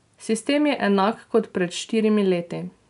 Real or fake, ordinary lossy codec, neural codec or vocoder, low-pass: real; none; none; 10.8 kHz